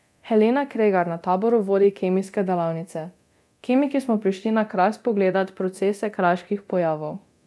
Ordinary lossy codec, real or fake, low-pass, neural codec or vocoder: none; fake; none; codec, 24 kHz, 0.9 kbps, DualCodec